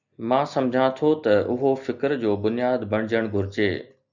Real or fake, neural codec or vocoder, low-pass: real; none; 7.2 kHz